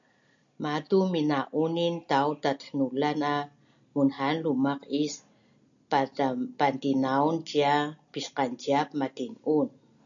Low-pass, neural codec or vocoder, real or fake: 7.2 kHz; none; real